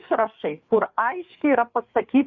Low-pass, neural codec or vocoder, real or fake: 7.2 kHz; codec, 16 kHz, 4 kbps, FreqCodec, larger model; fake